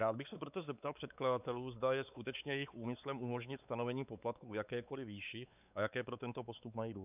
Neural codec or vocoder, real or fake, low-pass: codec, 16 kHz, 4 kbps, X-Codec, WavLM features, trained on Multilingual LibriSpeech; fake; 3.6 kHz